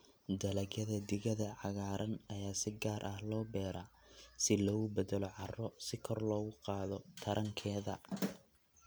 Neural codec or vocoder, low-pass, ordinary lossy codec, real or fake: none; none; none; real